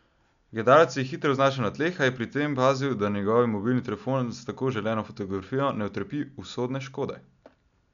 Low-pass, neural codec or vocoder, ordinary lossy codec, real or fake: 7.2 kHz; none; none; real